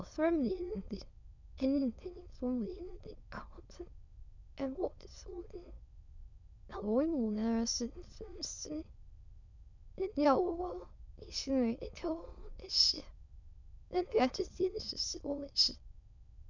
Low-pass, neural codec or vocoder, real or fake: 7.2 kHz; autoencoder, 22.05 kHz, a latent of 192 numbers a frame, VITS, trained on many speakers; fake